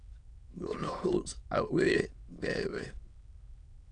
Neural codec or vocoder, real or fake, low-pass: autoencoder, 22.05 kHz, a latent of 192 numbers a frame, VITS, trained on many speakers; fake; 9.9 kHz